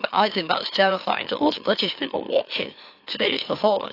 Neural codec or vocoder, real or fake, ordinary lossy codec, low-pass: autoencoder, 44.1 kHz, a latent of 192 numbers a frame, MeloTTS; fake; AAC, 32 kbps; 5.4 kHz